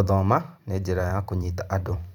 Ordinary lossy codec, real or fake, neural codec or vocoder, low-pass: none; fake; vocoder, 44.1 kHz, 128 mel bands every 512 samples, BigVGAN v2; 19.8 kHz